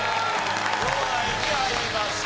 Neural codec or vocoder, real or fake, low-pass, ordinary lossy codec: none; real; none; none